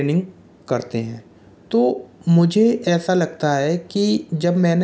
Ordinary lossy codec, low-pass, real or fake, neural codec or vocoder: none; none; real; none